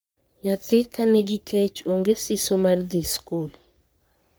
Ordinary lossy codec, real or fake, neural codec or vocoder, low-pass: none; fake; codec, 44.1 kHz, 3.4 kbps, Pupu-Codec; none